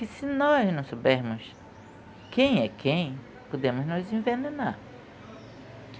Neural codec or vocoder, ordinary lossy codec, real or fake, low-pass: none; none; real; none